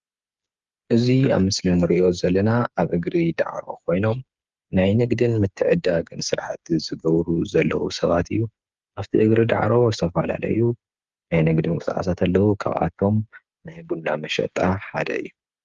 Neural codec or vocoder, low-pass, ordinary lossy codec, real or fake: codec, 16 kHz, 8 kbps, FreqCodec, smaller model; 7.2 kHz; Opus, 32 kbps; fake